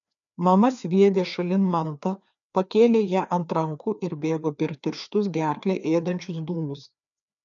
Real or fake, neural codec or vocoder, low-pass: fake; codec, 16 kHz, 2 kbps, FreqCodec, larger model; 7.2 kHz